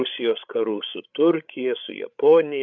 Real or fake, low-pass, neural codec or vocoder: fake; 7.2 kHz; codec, 16 kHz, 8 kbps, FreqCodec, larger model